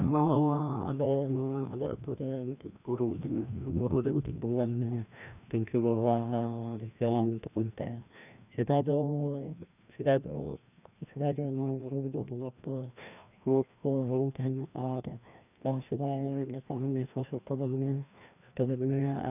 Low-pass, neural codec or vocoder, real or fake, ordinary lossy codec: 3.6 kHz; codec, 16 kHz, 1 kbps, FreqCodec, larger model; fake; none